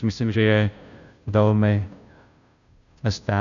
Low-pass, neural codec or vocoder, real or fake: 7.2 kHz; codec, 16 kHz, 0.5 kbps, FunCodec, trained on Chinese and English, 25 frames a second; fake